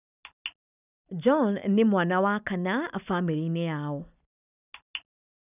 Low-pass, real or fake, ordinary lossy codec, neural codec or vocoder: 3.6 kHz; real; none; none